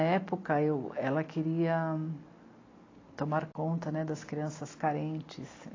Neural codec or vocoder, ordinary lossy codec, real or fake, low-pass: none; AAC, 32 kbps; real; 7.2 kHz